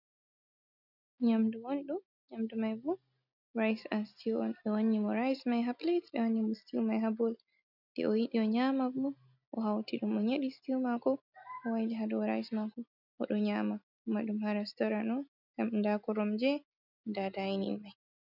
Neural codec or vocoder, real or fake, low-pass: none; real; 5.4 kHz